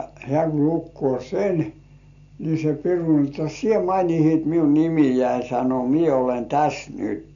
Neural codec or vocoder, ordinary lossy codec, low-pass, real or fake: none; none; 7.2 kHz; real